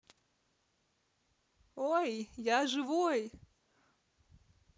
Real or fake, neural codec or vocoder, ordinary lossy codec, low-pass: real; none; none; none